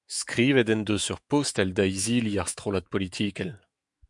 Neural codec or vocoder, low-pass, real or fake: autoencoder, 48 kHz, 128 numbers a frame, DAC-VAE, trained on Japanese speech; 10.8 kHz; fake